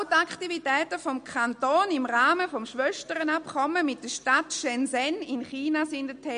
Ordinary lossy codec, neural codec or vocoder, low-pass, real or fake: MP3, 48 kbps; none; 9.9 kHz; real